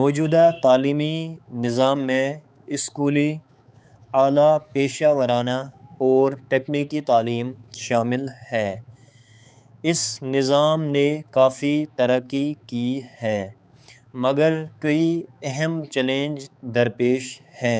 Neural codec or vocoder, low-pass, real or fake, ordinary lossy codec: codec, 16 kHz, 4 kbps, X-Codec, HuBERT features, trained on balanced general audio; none; fake; none